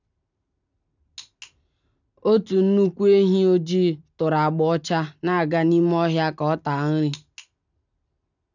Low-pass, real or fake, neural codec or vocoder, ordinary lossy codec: 7.2 kHz; real; none; none